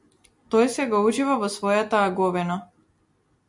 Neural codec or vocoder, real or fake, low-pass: none; real; 10.8 kHz